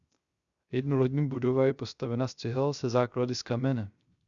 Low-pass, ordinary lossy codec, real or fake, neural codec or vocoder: 7.2 kHz; Opus, 64 kbps; fake; codec, 16 kHz, 0.3 kbps, FocalCodec